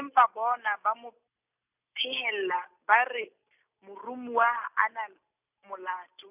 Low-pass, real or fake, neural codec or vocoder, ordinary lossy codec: 3.6 kHz; real; none; none